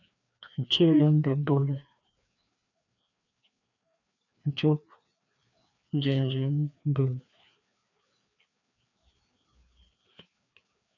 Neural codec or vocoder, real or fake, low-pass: codec, 16 kHz, 2 kbps, FreqCodec, larger model; fake; 7.2 kHz